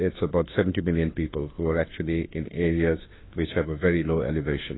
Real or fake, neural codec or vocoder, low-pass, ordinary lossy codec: fake; autoencoder, 48 kHz, 32 numbers a frame, DAC-VAE, trained on Japanese speech; 7.2 kHz; AAC, 16 kbps